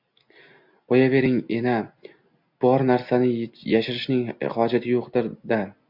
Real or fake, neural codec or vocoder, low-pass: real; none; 5.4 kHz